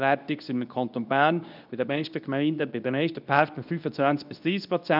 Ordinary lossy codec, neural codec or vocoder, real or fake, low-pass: none; codec, 24 kHz, 0.9 kbps, WavTokenizer, medium speech release version 2; fake; 5.4 kHz